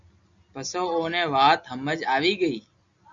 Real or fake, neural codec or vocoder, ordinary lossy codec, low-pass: real; none; Opus, 64 kbps; 7.2 kHz